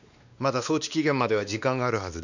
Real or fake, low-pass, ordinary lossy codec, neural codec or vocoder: fake; 7.2 kHz; none; codec, 16 kHz, 2 kbps, X-Codec, WavLM features, trained on Multilingual LibriSpeech